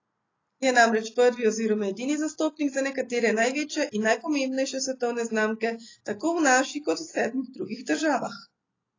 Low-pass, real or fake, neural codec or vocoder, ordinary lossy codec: 7.2 kHz; real; none; AAC, 32 kbps